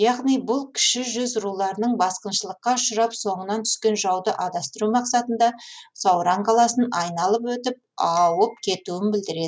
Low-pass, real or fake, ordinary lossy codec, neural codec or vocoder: none; real; none; none